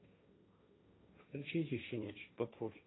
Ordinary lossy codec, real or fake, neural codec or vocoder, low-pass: AAC, 16 kbps; fake; codec, 16 kHz, 1.1 kbps, Voila-Tokenizer; 7.2 kHz